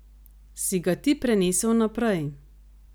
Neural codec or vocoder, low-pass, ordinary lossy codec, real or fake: none; none; none; real